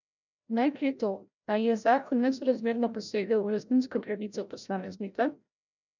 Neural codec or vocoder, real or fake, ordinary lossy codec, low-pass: codec, 16 kHz, 0.5 kbps, FreqCodec, larger model; fake; none; 7.2 kHz